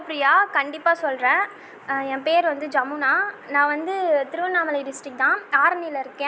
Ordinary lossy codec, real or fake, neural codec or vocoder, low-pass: none; real; none; none